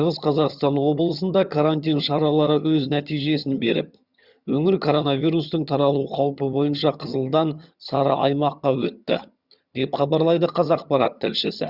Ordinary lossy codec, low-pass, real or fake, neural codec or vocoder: Opus, 64 kbps; 5.4 kHz; fake; vocoder, 22.05 kHz, 80 mel bands, HiFi-GAN